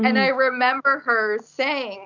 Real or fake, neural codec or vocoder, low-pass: real; none; 7.2 kHz